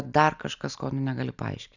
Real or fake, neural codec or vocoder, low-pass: real; none; 7.2 kHz